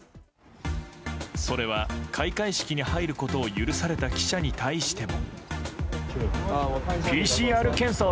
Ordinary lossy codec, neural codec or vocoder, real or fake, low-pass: none; none; real; none